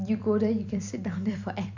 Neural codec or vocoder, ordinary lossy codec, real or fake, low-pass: vocoder, 44.1 kHz, 128 mel bands every 256 samples, BigVGAN v2; none; fake; 7.2 kHz